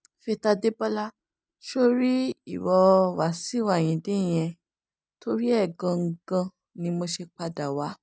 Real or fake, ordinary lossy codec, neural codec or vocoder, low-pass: real; none; none; none